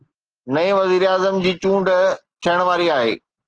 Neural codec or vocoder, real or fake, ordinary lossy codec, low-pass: none; real; Opus, 16 kbps; 9.9 kHz